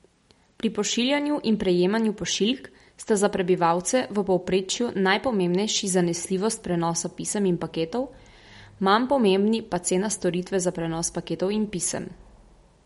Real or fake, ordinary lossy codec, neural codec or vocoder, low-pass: real; MP3, 48 kbps; none; 19.8 kHz